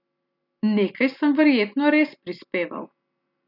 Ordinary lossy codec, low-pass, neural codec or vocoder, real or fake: none; 5.4 kHz; none; real